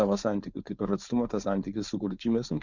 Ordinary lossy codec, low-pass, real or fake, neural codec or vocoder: Opus, 64 kbps; 7.2 kHz; fake; codec, 16 kHz, 4.8 kbps, FACodec